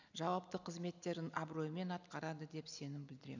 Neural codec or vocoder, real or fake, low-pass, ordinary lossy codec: none; real; 7.2 kHz; none